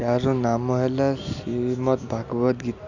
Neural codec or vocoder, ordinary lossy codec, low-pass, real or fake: none; none; 7.2 kHz; real